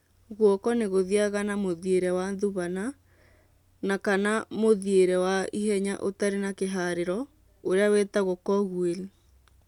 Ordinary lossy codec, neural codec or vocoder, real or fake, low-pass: none; none; real; 19.8 kHz